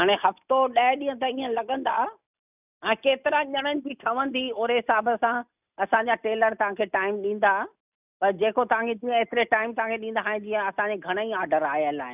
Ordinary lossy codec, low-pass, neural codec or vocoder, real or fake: none; 3.6 kHz; none; real